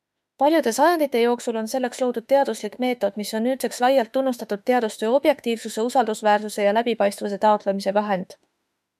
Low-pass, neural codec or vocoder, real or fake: 14.4 kHz; autoencoder, 48 kHz, 32 numbers a frame, DAC-VAE, trained on Japanese speech; fake